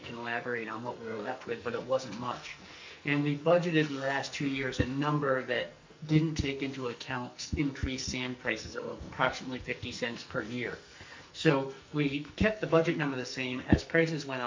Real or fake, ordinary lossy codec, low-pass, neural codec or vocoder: fake; MP3, 48 kbps; 7.2 kHz; codec, 44.1 kHz, 2.6 kbps, SNAC